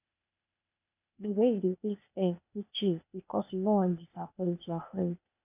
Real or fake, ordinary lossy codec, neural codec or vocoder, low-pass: fake; none; codec, 16 kHz, 0.8 kbps, ZipCodec; 3.6 kHz